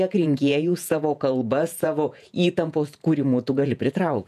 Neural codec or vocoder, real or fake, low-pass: vocoder, 48 kHz, 128 mel bands, Vocos; fake; 14.4 kHz